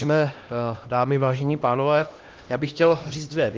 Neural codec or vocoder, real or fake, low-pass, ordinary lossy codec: codec, 16 kHz, 1 kbps, X-Codec, HuBERT features, trained on LibriSpeech; fake; 7.2 kHz; Opus, 32 kbps